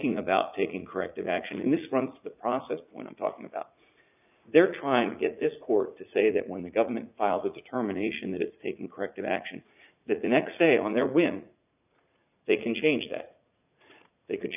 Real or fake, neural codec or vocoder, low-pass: fake; vocoder, 44.1 kHz, 80 mel bands, Vocos; 3.6 kHz